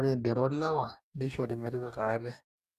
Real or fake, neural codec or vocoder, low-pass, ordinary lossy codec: fake; codec, 44.1 kHz, 2.6 kbps, DAC; 14.4 kHz; none